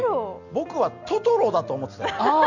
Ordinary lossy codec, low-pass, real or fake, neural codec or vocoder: none; 7.2 kHz; real; none